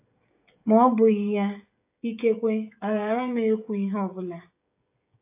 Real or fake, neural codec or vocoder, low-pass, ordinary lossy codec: fake; codec, 16 kHz, 16 kbps, FreqCodec, smaller model; 3.6 kHz; AAC, 32 kbps